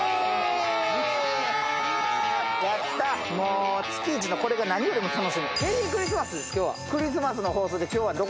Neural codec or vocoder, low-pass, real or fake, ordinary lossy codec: none; none; real; none